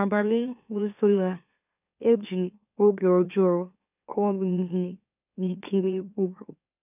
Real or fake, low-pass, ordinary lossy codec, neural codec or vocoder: fake; 3.6 kHz; none; autoencoder, 44.1 kHz, a latent of 192 numbers a frame, MeloTTS